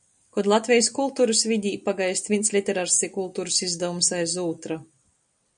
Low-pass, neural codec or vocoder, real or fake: 9.9 kHz; none; real